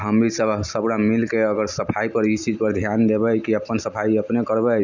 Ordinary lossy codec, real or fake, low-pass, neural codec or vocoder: none; real; 7.2 kHz; none